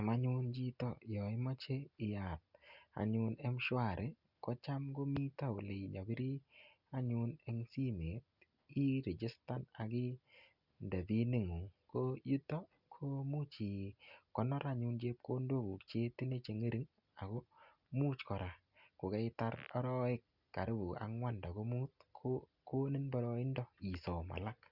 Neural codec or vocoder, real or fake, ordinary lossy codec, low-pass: none; real; Opus, 64 kbps; 5.4 kHz